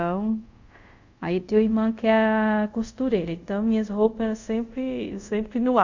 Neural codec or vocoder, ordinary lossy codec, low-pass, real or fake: codec, 24 kHz, 0.5 kbps, DualCodec; Opus, 64 kbps; 7.2 kHz; fake